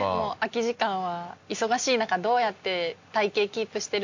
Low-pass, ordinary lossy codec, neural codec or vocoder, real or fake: 7.2 kHz; MP3, 48 kbps; none; real